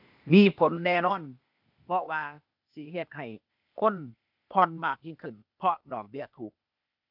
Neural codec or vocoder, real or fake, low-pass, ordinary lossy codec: codec, 16 kHz, 0.8 kbps, ZipCodec; fake; 5.4 kHz; none